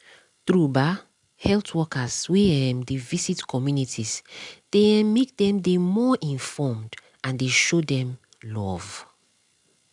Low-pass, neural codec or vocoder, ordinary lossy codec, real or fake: 10.8 kHz; none; none; real